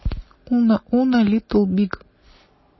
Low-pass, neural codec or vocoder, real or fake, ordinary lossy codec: 7.2 kHz; none; real; MP3, 24 kbps